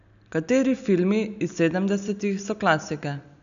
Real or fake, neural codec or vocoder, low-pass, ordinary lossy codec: real; none; 7.2 kHz; none